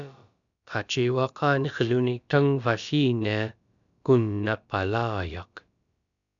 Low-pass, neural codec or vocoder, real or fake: 7.2 kHz; codec, 16 kHz, about 1 kbps, DyCAST, with the encoder's durations; fake